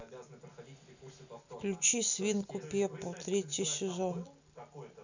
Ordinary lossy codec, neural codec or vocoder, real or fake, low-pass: none; none; real; 7.2 kHz